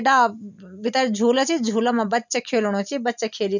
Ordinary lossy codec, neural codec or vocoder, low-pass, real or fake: none; none; 7.2 kHz; real